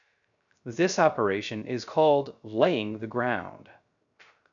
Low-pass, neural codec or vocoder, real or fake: 7.2 kHz; codec, 16 kHz, 0.3 kbps, FocalCodec; fake